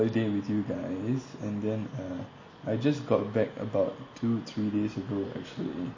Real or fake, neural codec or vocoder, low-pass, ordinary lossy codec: real; none; 7.2 kHz; MP3, 32 kbps